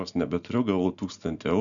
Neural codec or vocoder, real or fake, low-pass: none; real; 7.2 kHz